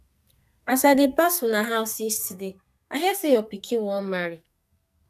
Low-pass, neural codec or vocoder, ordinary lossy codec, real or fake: 14.4 kHz; codec, 44.1 kHz, 2.6 kbps, SNAC; none; fake